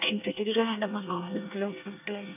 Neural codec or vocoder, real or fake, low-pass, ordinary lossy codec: codec, 24 kHz, 1 kbps, SNAC; fake; 3.6 kHz; none